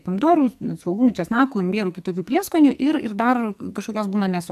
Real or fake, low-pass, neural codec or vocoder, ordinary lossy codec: fake; 14.4 kHz; codec, 44.1 kHz, 2.6 kbps, SNAC; MP3, 96 kbps